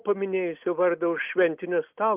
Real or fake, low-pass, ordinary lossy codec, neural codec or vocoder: real; 3.6 kHz; Opus, 24 kbps; none